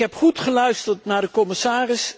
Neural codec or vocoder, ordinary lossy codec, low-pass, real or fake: none; none; none; real